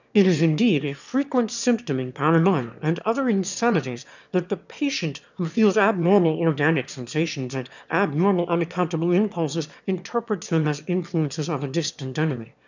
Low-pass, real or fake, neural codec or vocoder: 7.2 kHz; fake; autoencoder, 22.05 kHz, a latent of 192 numbers a frame, VITS, trained on one speaker